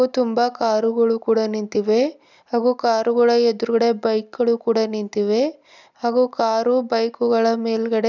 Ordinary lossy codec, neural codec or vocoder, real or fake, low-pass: none; none; real; 7.2 kHz